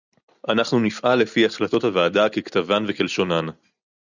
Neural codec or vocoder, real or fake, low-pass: none; real; 7.2 kHz